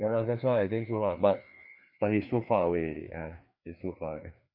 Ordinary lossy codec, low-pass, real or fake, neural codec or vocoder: none; 5.4 kHz; fake; codec, 16 kHz, 2 kbps, FreqCodec, larger model